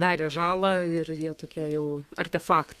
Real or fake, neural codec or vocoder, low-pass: fake; codec, 32 kHz, 1.9 kbps, SNAC; 14.4 kHz